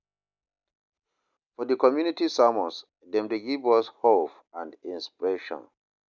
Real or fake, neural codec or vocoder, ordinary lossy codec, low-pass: real; none; none; 7.2 kHz